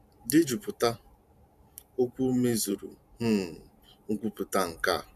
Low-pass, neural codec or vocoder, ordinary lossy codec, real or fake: 14.4 kHz; vocoder, 44.1 kHz, 128 mel bands every 512 samples, BigVGAN v2; none; fake